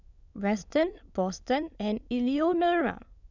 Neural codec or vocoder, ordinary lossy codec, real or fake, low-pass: autoencoder, 22.05 kHz, a latent of 192 numbers a frame, VITS, trained on many speakers; none; fake; 7.2 kHz